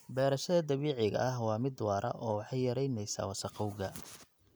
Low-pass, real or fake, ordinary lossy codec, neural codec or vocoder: none; real; none; none